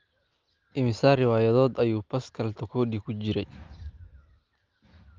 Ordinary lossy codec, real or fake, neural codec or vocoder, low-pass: Opus, 32 kbps; real; none; 7.2 kHz